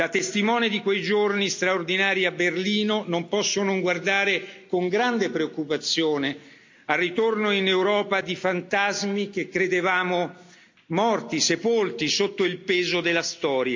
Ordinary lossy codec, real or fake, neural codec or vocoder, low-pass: MP3, 64 kbps; real; none; 7.2 kHz